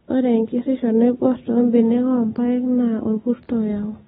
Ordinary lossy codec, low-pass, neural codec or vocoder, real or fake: AAC, 16 kbps; 10.8 kHz; none; real